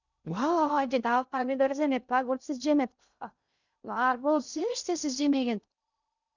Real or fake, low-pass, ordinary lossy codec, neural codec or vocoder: fake; 7.2 kHz; none; codec, 16 kHz in and 24 kHz out, 0.6 kbps, FocalCodec, streaming, 2048 codes